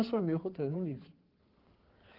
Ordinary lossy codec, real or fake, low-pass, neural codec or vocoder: Opus, 16 kbps; fake; 5.4 kHz; codec, 16 kHz in and 24 kHz out, 2.2 kbps, FireRedTTS-2 codec